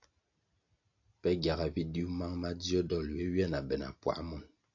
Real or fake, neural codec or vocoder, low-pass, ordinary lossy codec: real; none; 7.2 kHz; AAC, 48 kbps